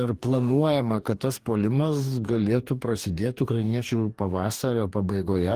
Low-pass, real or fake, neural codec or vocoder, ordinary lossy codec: 14.4 kHz; fake; codec, 44.1 kHz, 2.6 kbps, DAC; Opus, 24 kbps